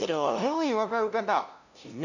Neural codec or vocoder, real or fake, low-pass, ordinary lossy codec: codec, 16 kHz, 0.5 kbps, FunCodec, trained on LibriTTS, 25 frames a second; fake; 7.2 kHz; none